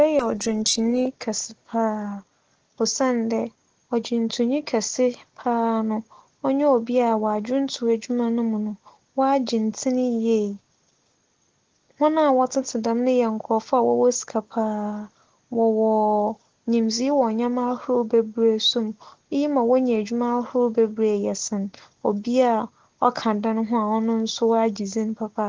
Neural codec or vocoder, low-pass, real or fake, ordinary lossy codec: none; 7.2 kHz; real; Opus, 16 kbps